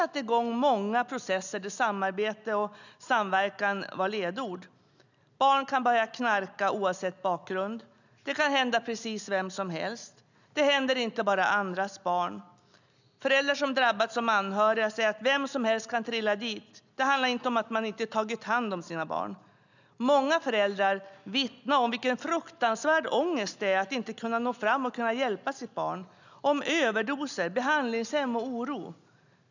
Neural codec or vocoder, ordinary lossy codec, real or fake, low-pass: none; none; real; 7.2 kHz